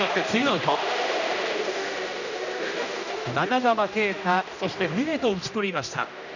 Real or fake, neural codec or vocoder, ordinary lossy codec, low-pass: fake; codec, 16 kHz, 1 kbps, X-Codec, HuBERT features, trained on general audio; none; 7.2 kHz